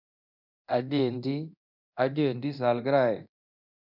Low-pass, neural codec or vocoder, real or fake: 5.4 kHz; codec, 24 kHz, 0.9 kbps, DualCodec; fake